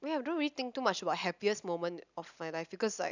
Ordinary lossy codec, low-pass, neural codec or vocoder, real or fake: none; 7.2 kHz; none; real